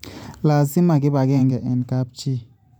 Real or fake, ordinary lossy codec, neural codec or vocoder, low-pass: fake; none; vocoder, 44.1 kHz, 128 mel bands every 512 samples, BigVGAN v2; 19.8 kHz